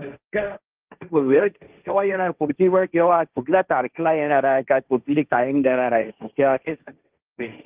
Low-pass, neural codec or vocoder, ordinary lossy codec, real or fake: 3.6 kHz; codec, 16 kHz, 1.1 kbps, Voila-Tokenizer; Opus, 24 kbps; fake